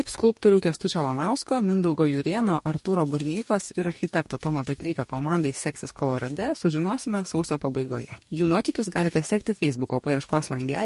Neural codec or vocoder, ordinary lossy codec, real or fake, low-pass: codec, 44.1 kHz, 2.6 kbps, DAC; MP3, 48 kbps; fake; 14.4 kHz